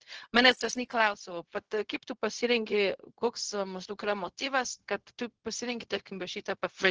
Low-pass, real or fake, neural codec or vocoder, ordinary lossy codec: 7.2 kHz; fake; codec, 16 kHz, 0.4 kbps, LongCat-Audio-Codec; Opus, 16 kbps